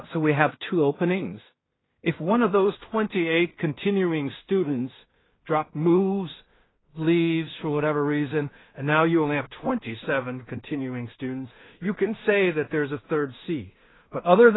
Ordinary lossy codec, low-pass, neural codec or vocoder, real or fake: AAC, 16 kbps; 7.2 kHz; codec, 16 kHz in and 24 kHz out, 0.4 kbps, LongCat-Audio-Codec, two codebook decoder; fake